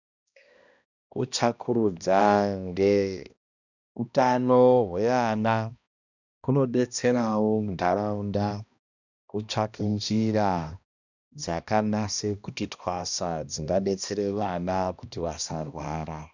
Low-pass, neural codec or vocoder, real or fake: 7.2 kHz; codec, 16 kHz, 1 kbps, X-Codec, HuBERT features, trained on balanced general audio; fake